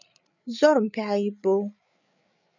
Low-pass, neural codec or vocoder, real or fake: 7.2 kHz; codec, 16 kHz, 8 kbps, FreqCodec, larger model; fake